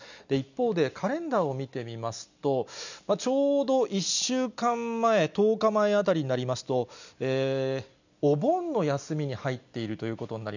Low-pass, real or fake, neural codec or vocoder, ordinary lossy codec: 7.2 kHz; real; none; none